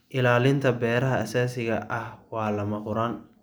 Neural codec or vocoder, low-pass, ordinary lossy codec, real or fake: none; none; none; real